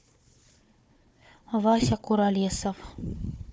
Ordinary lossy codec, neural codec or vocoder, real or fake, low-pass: none; codec, 16 kHz, 4 kbps, FunCodec, trained on Chinese and English, 50 frames a second; fake; none